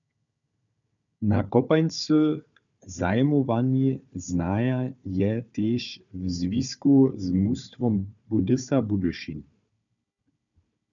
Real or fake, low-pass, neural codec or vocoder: fake; 7.2 kHz; codec, 16 kHz, 4 kbps, FunCodec, trained on Chinese and English, 50 frames a second